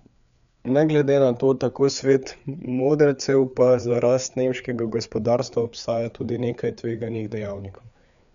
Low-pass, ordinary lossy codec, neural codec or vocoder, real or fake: 7.2 kHz; none; codec, 16 kHz, 4 kbps, FreqCodec, larger model; fake